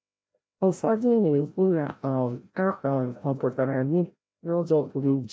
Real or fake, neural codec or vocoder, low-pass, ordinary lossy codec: fake; codec, 16 kHz, 0.5 kbps, FreqCodec, larger model; none; none